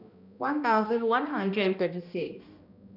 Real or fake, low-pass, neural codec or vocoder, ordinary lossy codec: fake; 5.4 kHz; codec, 16 kHz, 1 kbps, X-Codec, HuBERT features, trained on balanced general audio; none